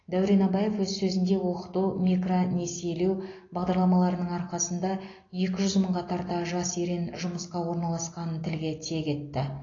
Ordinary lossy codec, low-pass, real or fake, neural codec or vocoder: AAC, 32 kbps; 7.2 kHz; real; none